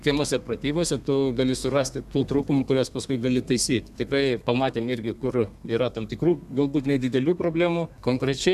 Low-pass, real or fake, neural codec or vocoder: 14.4 kHz; fake; codec, 32 kHz, 1.9 kbps, SNAC